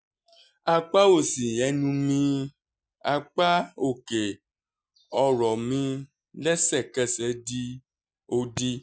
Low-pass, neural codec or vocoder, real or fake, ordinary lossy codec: none; none; real; none